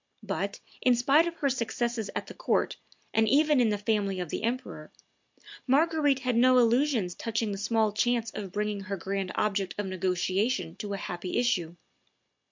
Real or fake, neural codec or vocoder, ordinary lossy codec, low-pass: real; none; MP3, 64 kbps; 7.2 kHz